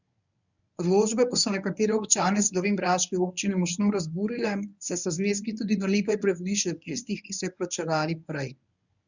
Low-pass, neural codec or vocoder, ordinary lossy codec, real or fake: 7.2 kHz; codec, 24 kHz, 0.9 kbps, WavTokenizer, medium speech release version 1; none; fake